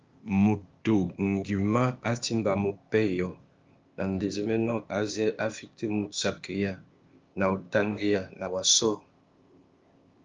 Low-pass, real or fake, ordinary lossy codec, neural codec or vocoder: 7.2 kHz; fake; Opus, 24 kbps; codec, 16 kHz, 0.8 kbps, ZipCodec